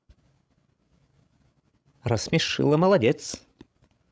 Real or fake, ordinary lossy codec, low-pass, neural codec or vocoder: fake; none; none; codec, 16 kHz, 16 kbps, FreqCodec, larger model